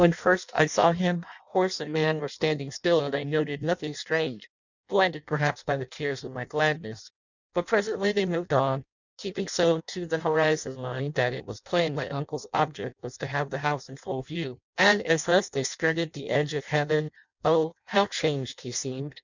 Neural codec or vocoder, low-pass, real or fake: codec, 16 kHz in and 24 kHz out, 0.6 kbps, FireRedTTS-2 codec; 7.2 kHz; fake